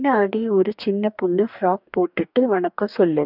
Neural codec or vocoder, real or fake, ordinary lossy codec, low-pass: codec, 44.1 kHz, 2.6 kbps, DAC; fake; none; 5.4 kHz